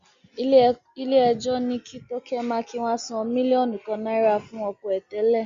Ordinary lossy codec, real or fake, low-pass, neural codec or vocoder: Opus, 64 kbps; real; 7.2 kHz; none